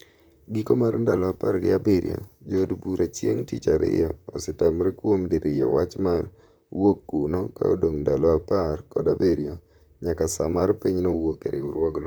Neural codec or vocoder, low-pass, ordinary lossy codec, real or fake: vocoder, 44.1 kHz, 128 mel bands, Pupu-Vocoder; none; none; fake